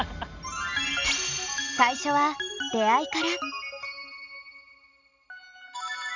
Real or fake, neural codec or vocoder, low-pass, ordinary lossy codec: real; none; 7.2 kHz; none